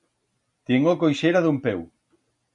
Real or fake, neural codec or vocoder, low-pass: real; none; 10.8 kHz